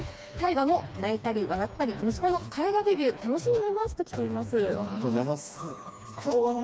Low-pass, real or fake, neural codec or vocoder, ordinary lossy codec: none; fake; codec, 16 kHz, 2 kbps, FreqCodec, smaller model; none